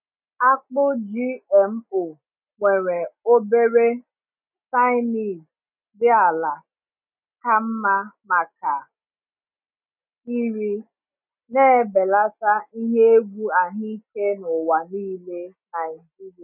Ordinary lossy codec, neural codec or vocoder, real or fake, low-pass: none; none; real; 3.6 kHz